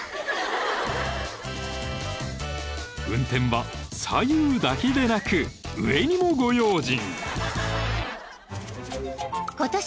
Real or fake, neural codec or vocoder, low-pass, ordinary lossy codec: real; none; none; none